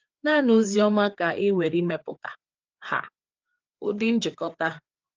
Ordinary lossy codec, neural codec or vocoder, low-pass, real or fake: Opus, 24 kbps; codec, 16 kHz, 4 kbps, FreqCodec, larger model; 7.2 kHz; fake